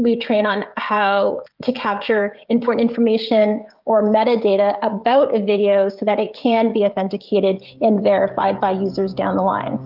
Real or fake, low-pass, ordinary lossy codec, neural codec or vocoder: fake; 5.4 kHz; Opus, 24 kbps; codec, 16 kHz, 16 kbps, FreqCodec, smaller model